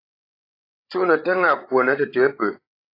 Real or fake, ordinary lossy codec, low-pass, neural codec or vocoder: fake; AAC, 24 kbps; 5.4 kHz; codec, 16 kHz, 16 kbps, FreqCodec, larger model